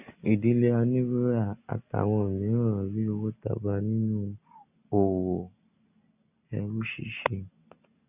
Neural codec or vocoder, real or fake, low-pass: none; real; 3.6 kHz